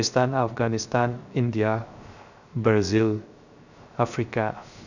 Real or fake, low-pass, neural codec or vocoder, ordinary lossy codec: fake; 7.2 kHz; codec, 16 kHz, 0.3 kbps, FocalCodec; none